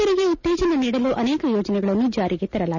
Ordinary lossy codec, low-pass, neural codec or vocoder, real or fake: none; 7.2 kHz; none; real